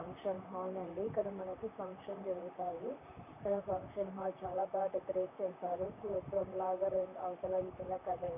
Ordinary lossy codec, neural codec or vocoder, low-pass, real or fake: none; vocoder, 44.1 kHz, 128 mel bands, Pupu-Vocoder; 3.6 kHz; fake